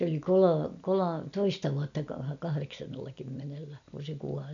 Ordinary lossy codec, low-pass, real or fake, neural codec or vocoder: none; 7.2 kHz; real; none